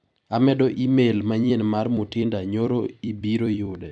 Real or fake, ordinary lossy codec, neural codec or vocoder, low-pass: fake; none; vocoder, 44.1 kHz, 128 mel bands every 256 samples, BigVGAN v2; 9.9 kHz